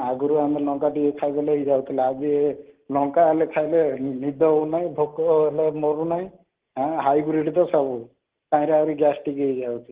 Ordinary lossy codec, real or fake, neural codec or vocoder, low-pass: Opus, 16 kbps; real; none; 3.6 kHz